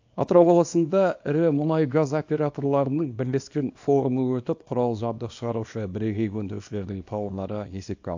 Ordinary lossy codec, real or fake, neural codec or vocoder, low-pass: AAC, 48 kbps; fake; codec, 24 kHz, 0.9 kbps, WavTokenizer, small release; 7.2 kHz